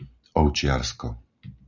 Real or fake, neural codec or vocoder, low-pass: real; none; 7.2 kHz